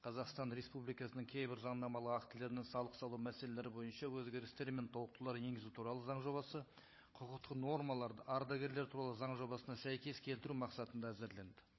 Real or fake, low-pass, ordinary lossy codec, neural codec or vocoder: fake; 7.2 kHz; MP3, 24 kbps; codec, 16 kHz, 16 kbps, FunCodec, trained on Chinese and English, 50 frames a second